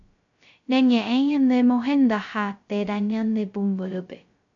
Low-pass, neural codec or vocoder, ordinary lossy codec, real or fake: 7.2 kHz; codec, 16 kHz, 0.2 kbps, FocalCodec; MP3, 64 kbps; fake